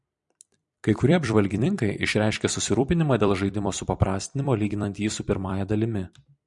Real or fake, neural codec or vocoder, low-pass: fake; vocoder, 44.1 kHz, 128 mel bands every 256 samples, BigVGAN v2; 10.8 kHz